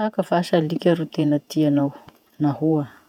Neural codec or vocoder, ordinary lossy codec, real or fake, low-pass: vocoder, 48 kHz, 128 mel bands, Vocos; none; fake; 19.8 kHz